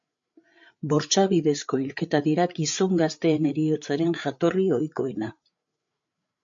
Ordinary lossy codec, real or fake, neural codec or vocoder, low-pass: MP3, 48 kbps; fake; codec, 16 kHz, 8 kbps, FreqCodec, larger model; 7.2 kHz